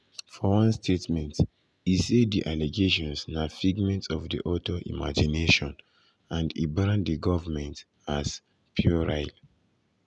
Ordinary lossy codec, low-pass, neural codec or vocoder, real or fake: none; none; none; real